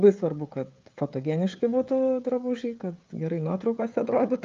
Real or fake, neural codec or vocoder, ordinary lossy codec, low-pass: fake; codec, 16 kHz, 16 kbps, FreqCodec, smaller model; Opus, 24 kbps; 7.2 kHz